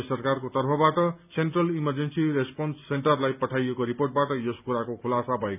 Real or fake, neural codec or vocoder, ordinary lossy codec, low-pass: real; none; none; 3.6 kHz